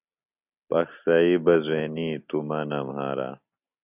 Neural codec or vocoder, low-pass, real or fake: none; 3.6 kHz; real